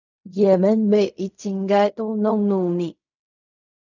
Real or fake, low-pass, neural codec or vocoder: fake; 7.2 kHz; codec, 16 kHz in and 24 kHz out, 0.4 kbps, LongCat-Audio-Codec, fine tuned four codebook decoder